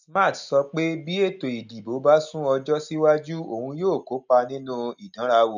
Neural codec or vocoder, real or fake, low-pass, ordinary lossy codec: none; real; 7.2 kHz; none